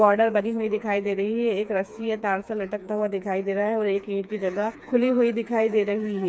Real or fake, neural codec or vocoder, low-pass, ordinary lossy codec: fake; codec, 16 kHz, 4 kbps, FreqCodec, smaller model; none; none